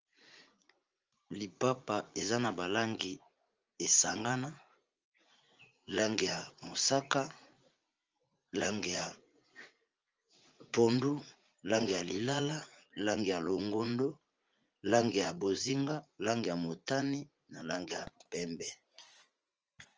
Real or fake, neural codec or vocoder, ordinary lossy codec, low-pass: fake; vocoder, 44.1 kHz, 80 mel bands, Vocos; Opus, 24 kbps; 7.2 kHz